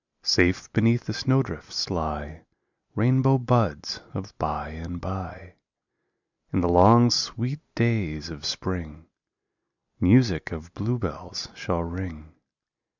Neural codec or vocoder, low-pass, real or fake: none; 7.2 kHz; real